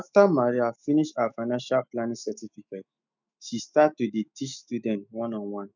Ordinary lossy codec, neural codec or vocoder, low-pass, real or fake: none; autoencoder, 48 kHz, 128 numbers a frame, DAC-VAE, trained on Japanese speech; 7.2 kHz; fake